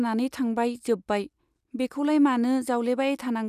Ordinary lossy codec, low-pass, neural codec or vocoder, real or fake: none; 14.4 kHz; none; real